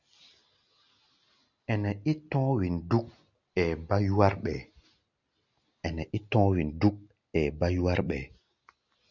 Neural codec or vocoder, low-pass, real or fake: none; 7.2 kHz; real